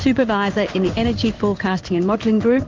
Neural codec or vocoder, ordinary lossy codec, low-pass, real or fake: codec, 16 kHz in and 24 kHz out, 1 kbps, XY-Tokenizer; Opus, 32 kbps; 7.2 kHz; fake